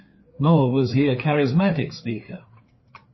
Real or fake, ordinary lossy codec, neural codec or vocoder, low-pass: fake; MP3, 24 kbps; codec, 16 kHz, 4 kbps, FreqCodec, larger model; 7.2 kHz